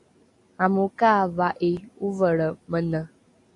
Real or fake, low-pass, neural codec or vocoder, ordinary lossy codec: real; 10.8 kHz; none; AAC, 48 kbps